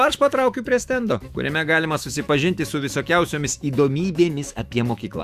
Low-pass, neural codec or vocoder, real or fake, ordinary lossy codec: 14.4 kHz; autoencoder, 48 kHz, 128 numbers a frame, DAC-VAE, trained on Japanese speech; fake; Opus, 64 kbps